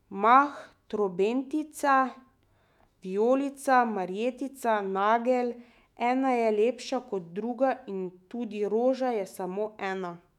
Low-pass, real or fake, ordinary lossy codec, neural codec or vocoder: 19.8 kHz; fake; none; autoencoder, 48 kHz, 128 numbers a frame, DAC-VAE, trained on Japanese speech